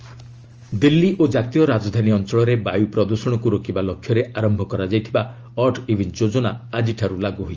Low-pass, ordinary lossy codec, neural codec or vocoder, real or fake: 7.2 kHz; Opus, 24 kbps; none; real